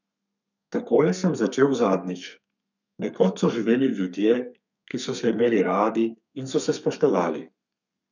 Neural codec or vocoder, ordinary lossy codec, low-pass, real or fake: codec, 32 kHz, 1.9 kbps, SNAC; none; 7.2 kHz; fake